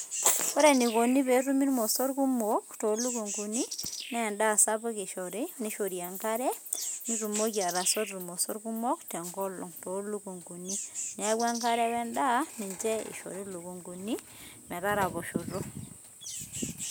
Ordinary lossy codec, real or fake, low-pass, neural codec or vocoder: none; real; none; none